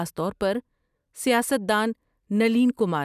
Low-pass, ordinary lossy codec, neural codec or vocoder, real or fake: 14.4 kHz; none; none; real